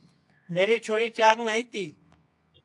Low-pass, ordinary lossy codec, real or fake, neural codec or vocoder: 10.8 kHz; AAC, 64 kbps; fake; codec, 24 kHz, 0.9 kbps, WavTokenizer, medium music audio release